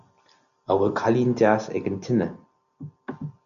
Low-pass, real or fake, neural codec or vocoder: 7.2 kHz; real; none